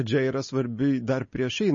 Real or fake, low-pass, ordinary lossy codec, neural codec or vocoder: real; 7.2 kHz; MP3, 32 kbps; none